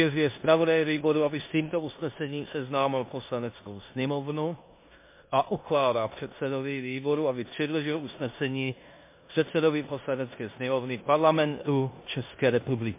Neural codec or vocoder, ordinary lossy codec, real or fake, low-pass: codec, 16 kHz in and 24 kHz out, 0.9 kbps, LongCat-Audio-Codec, four codebook decoder; MP3, 24 kbps; fake; 3.6 kHz